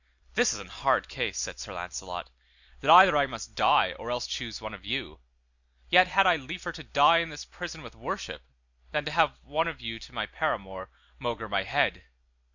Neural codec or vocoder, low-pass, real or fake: none; 7.2 kHz; real